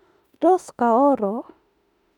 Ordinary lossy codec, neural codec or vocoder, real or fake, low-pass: none; autoencoder, 48 kHz, 32 numbers a frame, DAC-VAE, trained on Japanese speech; fake; 19.8 kHz